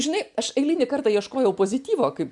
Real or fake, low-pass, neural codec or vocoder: real; 10.8 kHz; none